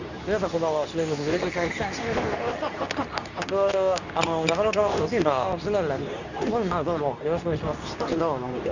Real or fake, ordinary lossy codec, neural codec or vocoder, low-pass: fake; none; codec, 24 kHz, 0.9 kbps, WavTokenizer, medium speech release version 2; 7.2 kHz